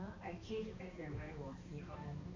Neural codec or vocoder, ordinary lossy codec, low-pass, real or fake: codec, 16 kHz, 2 kbps, X-Codec, HuBERT features, trained on balanced general audio; MP3, 48 kbps; 7.2 kHz; fake